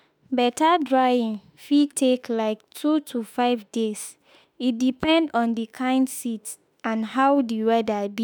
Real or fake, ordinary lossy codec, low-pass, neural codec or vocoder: fake; none; none; autoencoder, 48 kHz, 32 numbers a frame, DAC-VAE, trained on Japanese speech